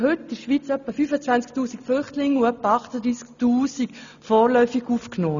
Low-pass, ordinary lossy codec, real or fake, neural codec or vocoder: 7.2 kHz; none; real; none